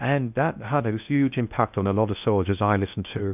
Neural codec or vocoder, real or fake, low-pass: codec, 16 kHz in and 24 kHz out, 0.6 kbps, FocalCodec, streaming, 4096 codes; fake; 3.6 kHz